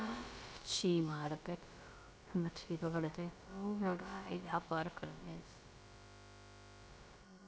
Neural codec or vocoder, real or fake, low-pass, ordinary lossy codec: codec, 16 kHz, about 1 kbps, DyCAST, with the encoder's durations; fake; none; none